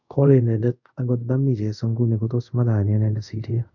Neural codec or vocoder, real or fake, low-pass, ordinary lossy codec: codec, 24 kHz, 0.5 kbps, DualCodec; fake; 7.2 kHz; none